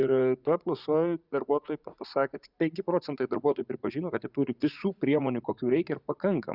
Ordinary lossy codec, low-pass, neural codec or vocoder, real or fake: Opus, 64 kbps; 5.4 kHz; vocoder, 44.1 kHz, 80 mel bands, Vocos; fake